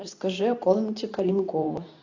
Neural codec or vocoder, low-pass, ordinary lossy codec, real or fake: codec, 24 kHz, 0.9 kbps, WavTokenizer, medium speech release version 2; 7.2 kHz; AAC, 48 kbps; fake